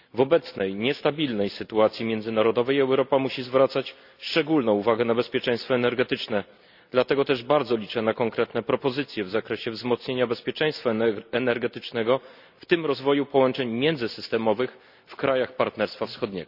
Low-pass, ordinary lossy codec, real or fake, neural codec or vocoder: 5.4 kHz; none; real; none